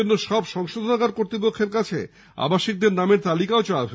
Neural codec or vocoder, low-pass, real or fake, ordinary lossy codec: none; none; real; none